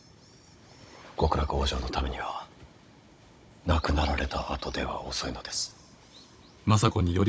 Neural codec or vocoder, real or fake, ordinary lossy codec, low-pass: codec, 16 kHz, 16 kbps, FunCodec, trained on Chinese and English, 50 frames a second; fake; none; none